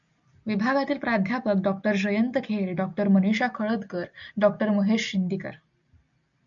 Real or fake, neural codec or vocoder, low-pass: real; none; 7.2 kHz